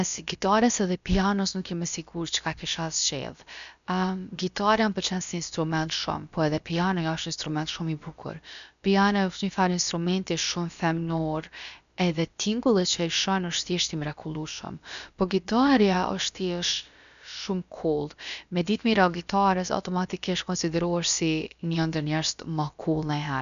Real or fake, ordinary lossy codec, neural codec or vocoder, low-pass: fake; none; codec, 16 kHz, about 1 kbps, DyCAST, with the encoder's durations; 7.2 kHz